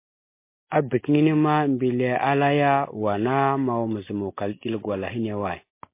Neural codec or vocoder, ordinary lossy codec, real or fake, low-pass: none; MP3, 24 kbps; real; 3.6 kHz